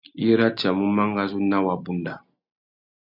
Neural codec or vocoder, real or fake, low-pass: none; real; 5.4 kHz